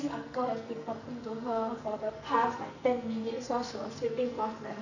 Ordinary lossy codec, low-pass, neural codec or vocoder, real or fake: none; 7.2 kHz; codec, 44.1 kHz, 2.6 kbps, SNAC; fake